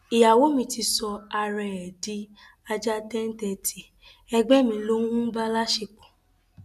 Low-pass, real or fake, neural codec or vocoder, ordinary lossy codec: 14.4 kHz; real; none; none